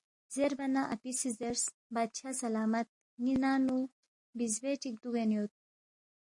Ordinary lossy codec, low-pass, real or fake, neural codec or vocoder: MP3, 48 kbps; 10.8 kHz; real; none